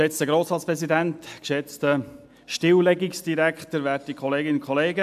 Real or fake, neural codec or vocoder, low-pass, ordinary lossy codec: real; none; 14.4 kHz; AAC, 96 kbps